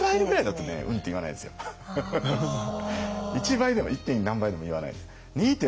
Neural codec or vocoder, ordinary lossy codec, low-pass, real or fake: none; none; none; real